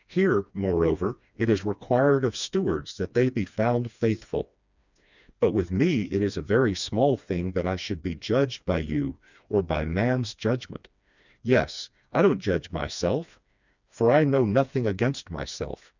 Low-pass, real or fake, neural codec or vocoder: 7.2 kHz; fake; codec, 16 kHz, 2 kbps, FreqCodec, smaller model